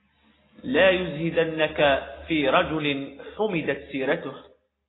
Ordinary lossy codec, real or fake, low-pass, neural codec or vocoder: AAC, 16 kbps; real; 7.2 kHz; none